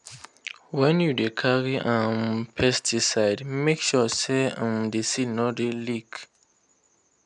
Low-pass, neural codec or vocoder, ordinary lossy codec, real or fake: 10.8 kHz; none; none; real